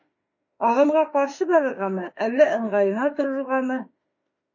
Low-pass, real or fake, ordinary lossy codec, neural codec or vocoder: 7.2 kHz; fake; MP3, 32 kbps; autoencoder, 48 kHz, 32 numbers a frame, DAC-VAE, trained on Japanese speech